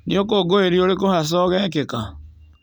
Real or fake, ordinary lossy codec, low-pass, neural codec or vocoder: real; none; 19.8 kHz; none